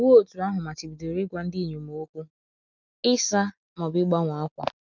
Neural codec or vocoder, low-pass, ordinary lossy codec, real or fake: none; 7.2 kHz; none; real